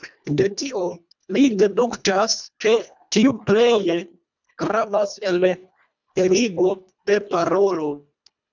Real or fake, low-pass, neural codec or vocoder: fake; 7.2 kHz; codec, 24 kHz, 1.5 kbps, HILCodec